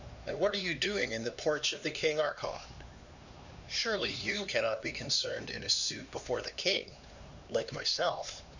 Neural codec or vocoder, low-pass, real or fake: codec, 16 kHz, 2 kbps, X-Codec, HuBERT features, trained on LibriSpeech; 7.2 kHz; fake